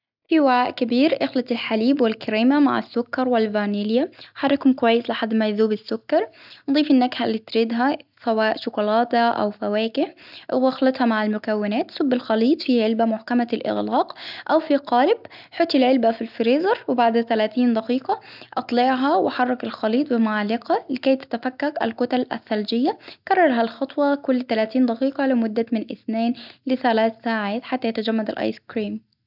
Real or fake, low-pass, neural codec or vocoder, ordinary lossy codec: real; 5.4 kHz; none; none